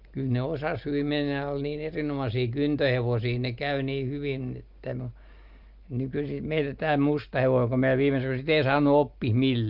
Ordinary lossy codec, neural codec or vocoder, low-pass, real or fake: Opus, 32 kbps; none; 5.4 kHz; real